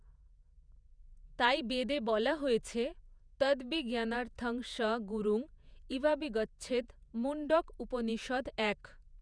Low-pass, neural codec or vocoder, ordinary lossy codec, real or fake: 9.9 kHz; vocoder, 48 kHz, 128 mel bands, Vocos; none; fake